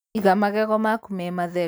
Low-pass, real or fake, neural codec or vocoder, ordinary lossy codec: none; real; none; none